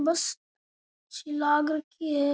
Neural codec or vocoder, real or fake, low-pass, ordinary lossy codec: none; real; none; none